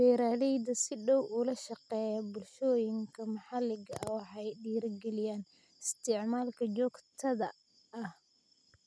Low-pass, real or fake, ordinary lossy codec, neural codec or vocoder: none; real; none; none